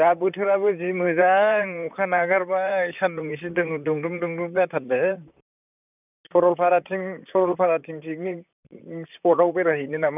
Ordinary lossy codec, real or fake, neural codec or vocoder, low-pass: none; fake; vocoder, 44.1 kHz, 128 mel bands, Pupu-Vocoder; 3.6 kHz